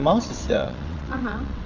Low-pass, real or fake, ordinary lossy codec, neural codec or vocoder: 7.2 kHz; fake; none; vocoder, 22.05 kHz, 80 mel bands, WaveNeXt